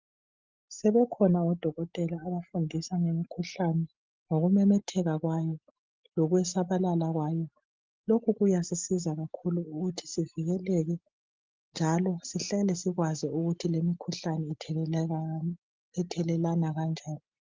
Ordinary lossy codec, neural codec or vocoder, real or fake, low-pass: Opus, 24 kbps; none; real; 7.2 kHz